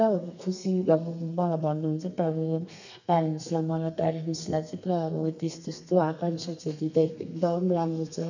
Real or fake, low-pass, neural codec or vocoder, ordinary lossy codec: fake; 7.2 kHz; codec, 32 kHz, 1.9 kbps, SNAC; none